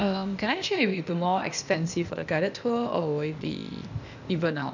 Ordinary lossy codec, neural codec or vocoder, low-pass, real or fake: none; codec, 16 kHz, 0.8 kbps, ZipCodec; 7.2 kHz; fake